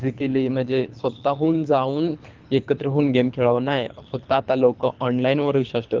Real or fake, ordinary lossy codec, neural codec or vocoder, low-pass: fake; Opus, 24 kbps; codec, 24 kHz, 3 kbps, HILCodec; 7.2 kHz